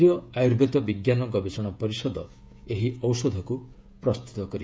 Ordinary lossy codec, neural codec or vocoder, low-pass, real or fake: none; codec, 16 kHz, 16 kbps, FreqCodec, smaller model; none; fake